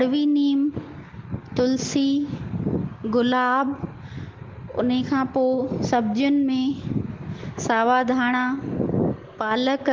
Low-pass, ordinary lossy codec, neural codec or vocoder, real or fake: 7.2 kHz; Opus, 16 kbps; none; real